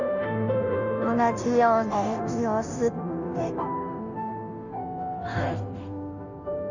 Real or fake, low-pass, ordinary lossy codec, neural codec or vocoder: fake; 7.2 kHz; none; codec, 16 kHz, 0.5 kbps, FunCodec, trained on Chinese and English, 25 frames a second